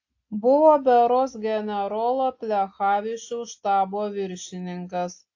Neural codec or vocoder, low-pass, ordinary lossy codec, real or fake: none; 7.2 kHz; AAC, 48 kbps; real